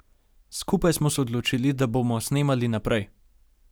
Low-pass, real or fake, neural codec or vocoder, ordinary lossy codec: none; real; none; none